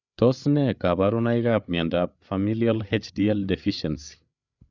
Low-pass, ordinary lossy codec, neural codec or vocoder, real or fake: 7.2 kHz; none; codec, 16 kHz, 8 kbps, FreqCodec, larger model; fake